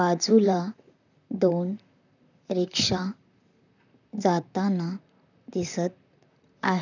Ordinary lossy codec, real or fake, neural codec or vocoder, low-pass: none; fake; vocoder, 44.1 kHz, 128 mel bands, Pupu-Vocoder; 7.2 kHz